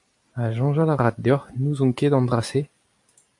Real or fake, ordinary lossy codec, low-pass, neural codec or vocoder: real; AAC, 64 kbps; 10.8 kHz; none